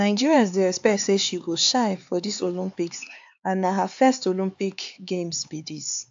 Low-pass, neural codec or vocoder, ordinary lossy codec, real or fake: 7.2 kHz; codec, 16 kHz, 4 kbps, X-Codec, HuBERT features, trained on LibriSpeech; AAC, 64 kbps; fake